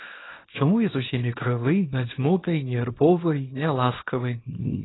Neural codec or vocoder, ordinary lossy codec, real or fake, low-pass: codec, 24 kHz, 0.9 kbps, WavTokenizer, small release; AAC, 16 kbps; fake; 7.2 kHz